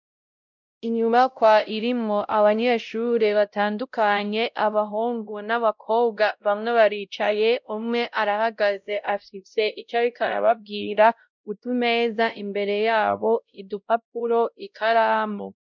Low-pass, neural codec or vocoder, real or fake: 7.2 kHz; codec, 16 kHz, 0.5 kbps, X-Codec, WavLM features, trained on Multilingual LibriSpeech; fake